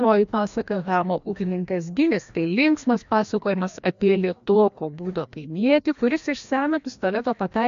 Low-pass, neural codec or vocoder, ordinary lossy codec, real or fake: 7.2 kHz; codec, 16 kHz, 1 kbps, FreqCodec, larger model; MP3, 64 kbps; fake